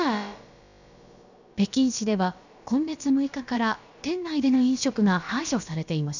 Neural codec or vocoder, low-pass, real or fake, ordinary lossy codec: codec, 16 kHz, about 1 kbps, DyCAST, with the encoder's durations; 7.2 kHz; fake; none